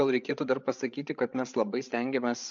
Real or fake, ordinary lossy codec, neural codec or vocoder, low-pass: fake; AAC, 64 kbps; codec, 16 kHz, 8 kbps, FreqCodec, larger model; 7.2 kHz